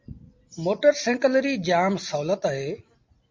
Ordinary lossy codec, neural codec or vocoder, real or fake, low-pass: MP3, 48 kbps; none; real; 7.2 kHz